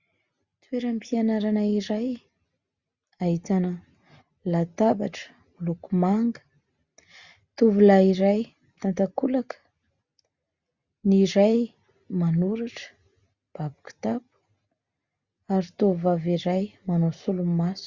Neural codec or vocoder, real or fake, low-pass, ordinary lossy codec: none; real; 7.2 kHz; Opus, 64 kbps